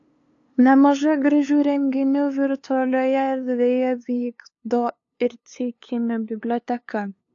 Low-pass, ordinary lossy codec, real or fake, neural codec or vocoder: 7.2 kHz; AAC, 48 kbps; fake; codec, 16 kHz, 2 kbps, FunCodec, trained on LibriTTS, 25 frames a second